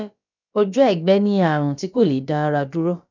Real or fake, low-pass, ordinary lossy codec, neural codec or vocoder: fake; 7.2 kHz; none; codec, 16 kHz, about 1 kbps, DyCAST, with the encoder's durations